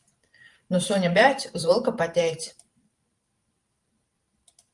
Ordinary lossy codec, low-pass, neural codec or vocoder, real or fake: Opus, 32 kbps; 10.8 kHz; vocoder, 44.1 kHz, 128 mel bands every 512 samples, BigVGAN v2; fake